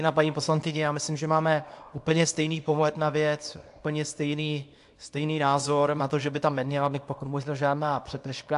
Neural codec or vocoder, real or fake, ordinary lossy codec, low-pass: codec, 24 kHz, 0.9 kbps, WavTokenizer, small release; fake; AAC, 64 kbps; 10.8 kHz